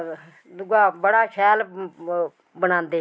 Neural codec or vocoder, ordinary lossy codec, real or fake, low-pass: none; none; real; none